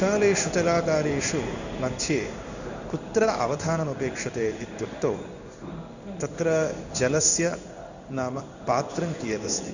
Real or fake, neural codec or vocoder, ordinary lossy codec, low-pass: fake; codec, 16 kHz in and 24 kHz out, 1 kbps, XY-Tokenizer; none; 7.2 kHz